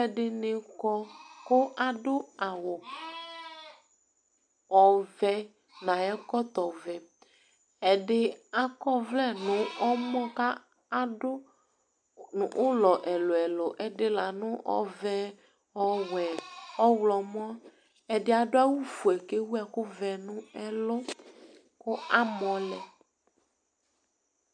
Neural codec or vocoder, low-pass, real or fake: none; 9.9 kHz; real